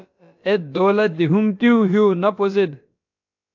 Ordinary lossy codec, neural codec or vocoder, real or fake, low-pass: AAC, 48 kbps; codec, 16 kHz, about 1 kbps, DyCAST, with the encoder's durations; fake; 7.2 kHz